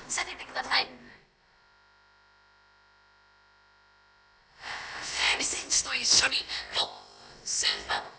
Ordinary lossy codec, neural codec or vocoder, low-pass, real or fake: none; codec, 16 kHz, about 1 kbps, DyCAST, with the encoder's durations; none; fake